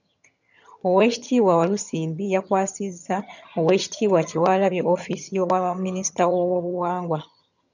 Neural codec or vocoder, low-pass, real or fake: vocoder, 22.05 kHz, 80 mel bands, HiFi-GAN; 7.2 kHz; fake